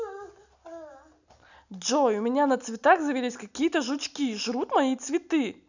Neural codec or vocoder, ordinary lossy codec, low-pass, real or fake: none; none; 7.2 kHz; real